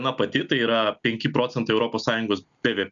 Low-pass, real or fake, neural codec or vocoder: 7.2 kHz; real; none